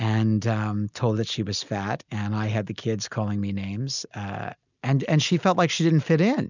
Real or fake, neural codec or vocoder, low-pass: real; none; 7.2 kHz